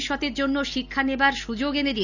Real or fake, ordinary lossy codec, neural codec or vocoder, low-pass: real; none; none; 7.2 kHz